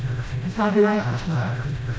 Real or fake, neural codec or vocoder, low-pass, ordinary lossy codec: fake; codec, 16 kHz, 0.5 kbps, FreqCodec, smaller model; none; none